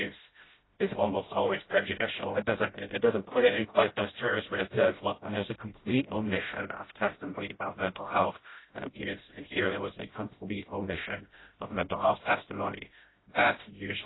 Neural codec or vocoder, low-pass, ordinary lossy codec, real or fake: codec, 16 kHz, 0.5 kbps, FreqCodec, smaller model; 7.2 kHz; AAC, 16 kbps; fake